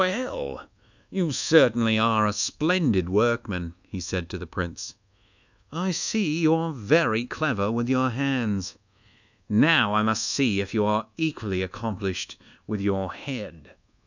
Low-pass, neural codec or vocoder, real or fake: 7.2 kHz; codec, 24 kHz, 1.2 kbps, DualCodec; fake